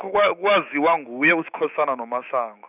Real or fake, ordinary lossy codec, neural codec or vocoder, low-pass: fake; none; autoencoder, 48 kHz, 128 numbers a frame, DAC-VAE, trained on Japanese speech; 3.6 kHz